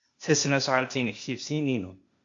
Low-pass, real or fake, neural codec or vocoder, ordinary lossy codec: 7.2 kHz; fake; codec, 16 kHz, 0.8 kbps, ZipCodec; MP3, 48 kbps